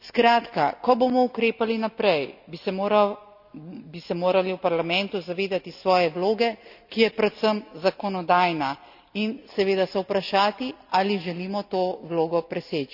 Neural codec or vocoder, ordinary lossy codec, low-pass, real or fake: none; none; 5.4 kHz; real